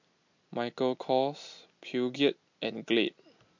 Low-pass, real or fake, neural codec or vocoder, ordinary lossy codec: 7.2 kHz; real; none; MP3, 48 kbps